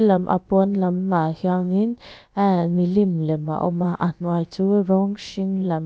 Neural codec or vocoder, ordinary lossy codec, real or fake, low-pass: codec, 16 kHz, about 1 kbps, DyCAST, with the encoder's durations; none; fake; none